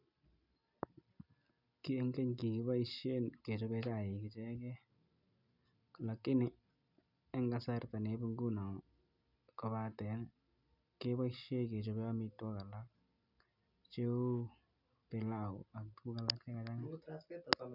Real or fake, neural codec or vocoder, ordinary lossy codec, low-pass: real; none; none; 5.4 kHz